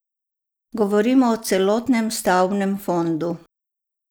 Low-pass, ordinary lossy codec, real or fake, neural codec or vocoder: none; none; real; none